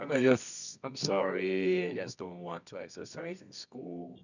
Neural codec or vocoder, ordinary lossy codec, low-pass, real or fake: codec, 24 kHz, 0.9 kbps, WavTokenizer, medium music audio release; none; 7.2 kHz; fake